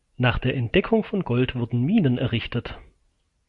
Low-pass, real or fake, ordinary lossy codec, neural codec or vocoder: 10.8 kHz; real; MP3, 48 kbps; none